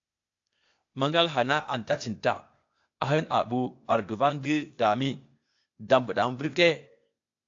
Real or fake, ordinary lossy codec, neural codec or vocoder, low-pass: fake; AAC, 48 kbps; codec, 16 kHz, 0.8 kbps, ZipCodec; 7.2 kHz